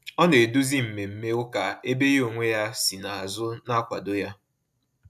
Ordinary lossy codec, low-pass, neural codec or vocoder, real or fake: MP3, 96 kbps; 14.4 kHz; none; real